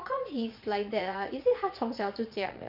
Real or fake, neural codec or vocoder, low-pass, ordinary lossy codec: fake; vocoder, 22.05 kHz, 80 mel bands, Vocos; 5.4 kHz; none